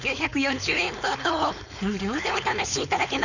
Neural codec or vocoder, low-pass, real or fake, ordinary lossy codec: codec, 16 kHz, 4.8 kbps, FACodec; 7.2 kHz; fake; none